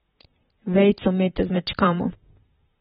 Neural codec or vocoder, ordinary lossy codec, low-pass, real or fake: vocoder, 44.1 kHz, 128 mel bands, Pupu-Vocoder; AAC, 16 kbps; 19.8 kHz; fake